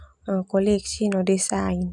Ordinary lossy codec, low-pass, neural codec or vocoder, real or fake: none; 10.8 kHz; none; real